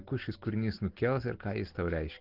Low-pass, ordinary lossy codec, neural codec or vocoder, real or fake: 5.4 kHz; Opus, 16 kbps; none; real